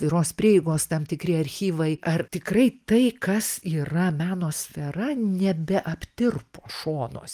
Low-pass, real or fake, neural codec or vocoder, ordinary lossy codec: 14.4 kHz; real; none; Opus, 32 kbps